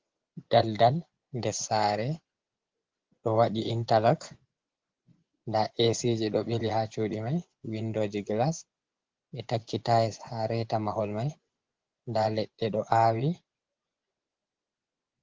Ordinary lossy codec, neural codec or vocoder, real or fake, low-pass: Opus, 16 kbps; vocoder, 24 kHz, 100 mel bands, Vocos; fake; 7.2 kHz